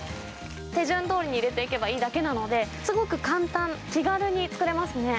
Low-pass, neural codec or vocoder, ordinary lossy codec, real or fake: none; none; none; real